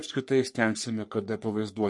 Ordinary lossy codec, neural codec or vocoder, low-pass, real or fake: MP3, 48 kbps; codec, 44.1 kHz, 3.4 kbps, Pupu-Codec; 10.8 kHz; fake